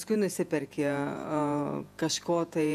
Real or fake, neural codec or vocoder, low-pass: fake; vocoder, 48 kHz, 128 mel bands, Vocos; 14.4 kHz